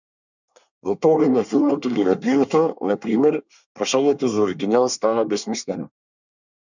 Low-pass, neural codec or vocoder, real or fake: 7.2 kHz; codec, 24 kHz, 1 kbps, SNAC; fake